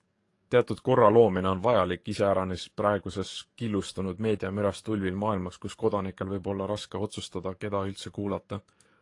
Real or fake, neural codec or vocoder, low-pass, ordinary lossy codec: fake; codec, 44.1 kHz, 7.8 kbps, DAC; 10.8 kHz; AAC, 48 kbps